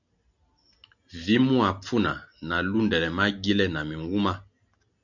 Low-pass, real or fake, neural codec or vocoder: 7.2 kHz; real; none